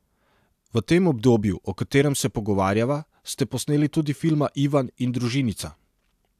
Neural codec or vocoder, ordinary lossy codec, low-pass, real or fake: none; AAC, 96 kbps; 14.4 kHz; real